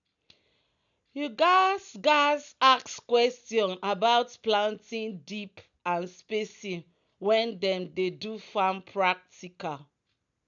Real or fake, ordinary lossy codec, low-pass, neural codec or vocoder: real; none; 7.2 kHz; none